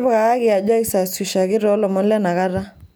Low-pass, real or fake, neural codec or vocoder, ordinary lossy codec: none; real; none; none